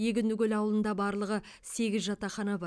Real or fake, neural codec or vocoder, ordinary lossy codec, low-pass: real; none; none; none